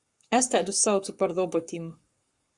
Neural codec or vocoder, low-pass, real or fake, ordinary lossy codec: codec, 44.1 kHz, 7.8 kbps, Pupu-Codec; 10.8 kHz; fake; Opus, 64 kbps